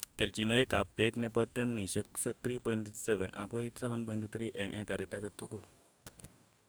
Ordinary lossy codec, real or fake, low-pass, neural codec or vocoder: none; fake; none; codec, 44.1 kHz, 2.6 kbps, DAC